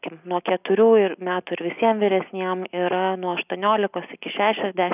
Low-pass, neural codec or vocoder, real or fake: 3.6 kHz; none; real